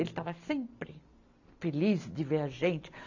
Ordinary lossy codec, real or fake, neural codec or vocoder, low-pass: AAC, 48 kbps; real; none; 7.2 kHz